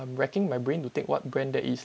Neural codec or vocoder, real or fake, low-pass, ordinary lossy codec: none; real; none; none